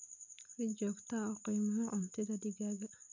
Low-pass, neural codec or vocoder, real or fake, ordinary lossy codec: 7.2 kHz; none; real; none